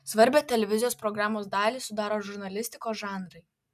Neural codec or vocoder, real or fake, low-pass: none; real; 14.4 kHz